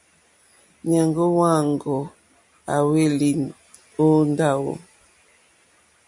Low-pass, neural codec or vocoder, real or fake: 10.8 kHz; none; real